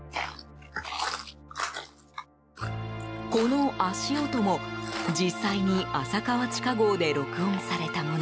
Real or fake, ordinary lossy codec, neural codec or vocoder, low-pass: real; none; none; none